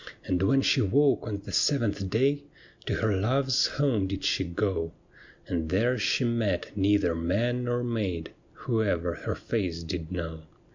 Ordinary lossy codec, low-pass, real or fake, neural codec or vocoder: MP3, 64 kbps; 7.2 kHz; real; none